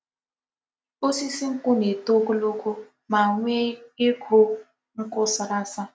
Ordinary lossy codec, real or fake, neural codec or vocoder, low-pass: none; real; none; none